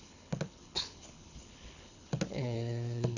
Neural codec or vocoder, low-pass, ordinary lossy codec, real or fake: codec, 24 kHz, 6 kbps, HILCodec; 7.2 kHz; none; fake